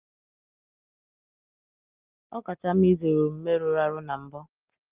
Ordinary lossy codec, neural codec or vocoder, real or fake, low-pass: Opus, 24 kbps; none; real; 3.6 kHz